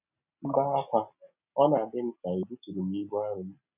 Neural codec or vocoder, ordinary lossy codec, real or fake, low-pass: none; none; real; 3.6 kHz